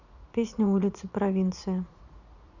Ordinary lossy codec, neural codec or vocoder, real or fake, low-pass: none; vocoder, 44.1 kHz, 80 mel bands, Vocos; fake; 7.2 kHz